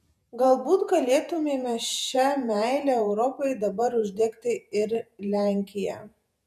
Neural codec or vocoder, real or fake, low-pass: vocoder, 48 kHz, 128 mel bands, Vocos; fake; 14.4 kHz